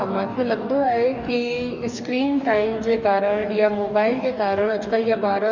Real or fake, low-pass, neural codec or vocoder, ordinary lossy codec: fake; 7.2 kHz; codec, 44.1 kHz, 2.6 kbps, DAC; none